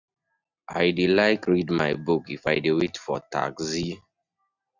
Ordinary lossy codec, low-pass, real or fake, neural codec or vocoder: none; 7.2 kHz; real; none